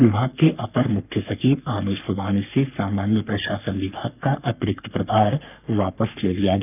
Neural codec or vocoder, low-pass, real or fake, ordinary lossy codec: codec, 44.1 kHz, 3.4 kbps, Pupu-Codec; 3.6 kHz; fake; none